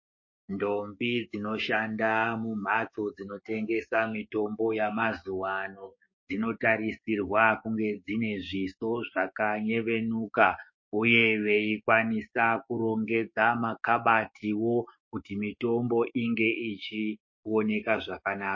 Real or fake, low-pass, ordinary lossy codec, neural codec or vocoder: fake; 7.2 kHz; MP3, 32 kbps; codec, 16 kHz, 6 kbps, DAC